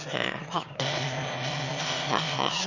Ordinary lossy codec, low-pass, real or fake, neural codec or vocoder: Opus, 64 kbps; 7.2 kHz; fake; autoencoder, 22.05 kHz, a latent of 192 numbers a frame, VITS, trained on one speaker